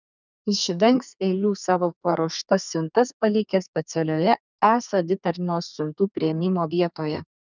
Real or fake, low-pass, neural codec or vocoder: fake; 7.2 kHz; codec, 32 kHz, 1.9 kbps, SNAC